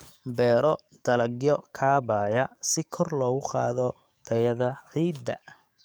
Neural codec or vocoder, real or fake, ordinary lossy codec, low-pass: codec, 44.1 kHz, 7.8 kbps, DAC; fake; none; none